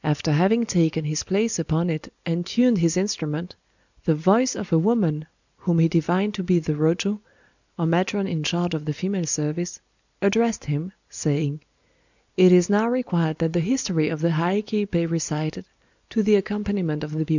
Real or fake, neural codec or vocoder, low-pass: real; none; 7.2 kHz